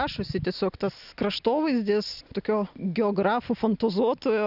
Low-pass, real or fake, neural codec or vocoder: 5.4 kHz; real; none